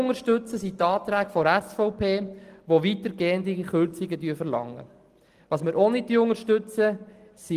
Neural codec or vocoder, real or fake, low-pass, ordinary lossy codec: none; real; 14.4 kHz; Opus, 24 kbps